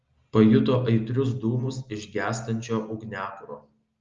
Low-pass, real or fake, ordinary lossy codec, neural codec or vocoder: 7.2 kHz; real; Opus, 32 kbps; none